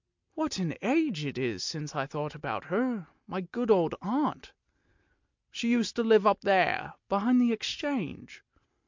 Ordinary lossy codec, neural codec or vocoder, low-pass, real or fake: MP3, 64 kbps; none; 7.2 kHz; real